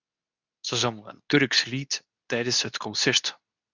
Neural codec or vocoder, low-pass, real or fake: codec, 24 kHz, 0.9 kbps, WavTokenizer, medium speech release version 2; 7.2 kHz; fake